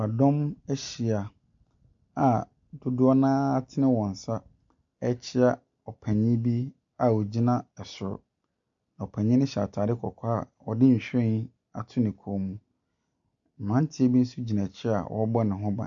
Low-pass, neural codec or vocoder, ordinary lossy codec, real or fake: 7.2 kHz; none; MP3, 64 kbps; real